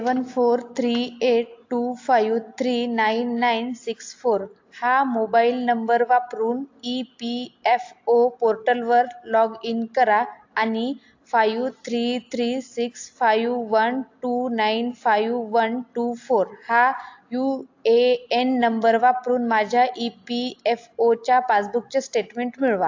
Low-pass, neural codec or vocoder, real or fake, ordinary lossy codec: 7.2 kHz; none; real; AAC, 48 kbps